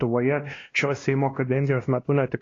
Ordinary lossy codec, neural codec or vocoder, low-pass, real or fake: AAC, 32 kbps; codec, 16 kHz, 1 kbps, X-Codec, WavLM features, trained on Multilingual LibriSpeech; 7.2 kHz; fake